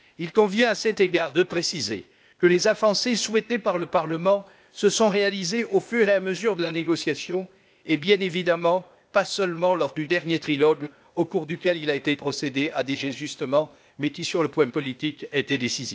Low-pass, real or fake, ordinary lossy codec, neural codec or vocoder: none; fake; none; codec, 16 kHz, 0.8 kbps, ZipCodec